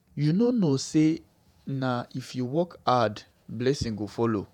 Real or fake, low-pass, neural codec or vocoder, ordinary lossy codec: fake; 19.8 kHz; vocoder, 48 kHz, 128 mel bands, Vocos; none